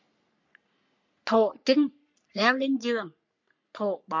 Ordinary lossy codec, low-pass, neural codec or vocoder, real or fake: MP3, 64 kbps; 7.2 kHz; codec, 44.1 kHz, 3.4 kbps, Pupu-Codec; fake